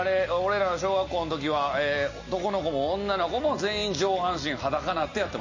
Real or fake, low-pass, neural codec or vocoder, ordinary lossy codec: real; 7.2 kHz; none; MP3, 32 kbps